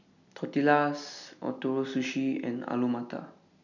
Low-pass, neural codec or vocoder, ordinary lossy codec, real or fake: 7.2 kHz; none; none; real